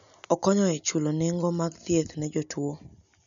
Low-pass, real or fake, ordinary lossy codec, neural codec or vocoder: 7.2 kHz; real; none; none